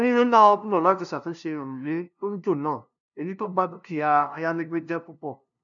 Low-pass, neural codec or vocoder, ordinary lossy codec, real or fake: 7.2 kHz; codec, 16 kHz, 0.5 kbps, FunCodec, trained on LibriTTS, 25 frames a second; none; fake